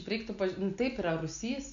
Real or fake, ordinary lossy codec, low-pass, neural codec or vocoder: real; AAC, 64 kbps; 7.2 kHz; none